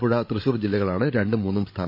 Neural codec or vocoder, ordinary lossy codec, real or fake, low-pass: codec, 16 kHz, 16 kbps, FreqCodec, larger model; MP3, 32 kbps; fake; 5.4 kHz